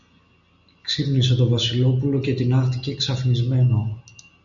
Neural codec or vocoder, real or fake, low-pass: none; real; 7.2 kHz